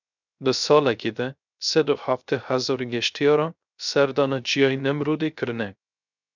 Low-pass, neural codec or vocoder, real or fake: 7.2 kHz; codec, 16 kHz, 0.3 kbps, FocalCodec; fake